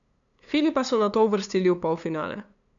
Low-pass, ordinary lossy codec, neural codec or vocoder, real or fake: 7.2 kHz; none; codec, 16 kHz, 2 kbps, FunCodec, trained on LibriTTS, 25 frames a second; fake